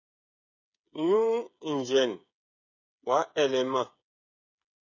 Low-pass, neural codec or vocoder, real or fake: 7.2 kHz; codec, 16 kHz, 8 kbps, FreqCodec, smaller model; fake